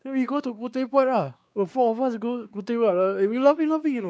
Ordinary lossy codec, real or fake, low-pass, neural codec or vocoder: none; fake; none; codec, 16 kHz, 2 kbps, X-Codec, WavLM features, trained on Multilingual LibriSpeech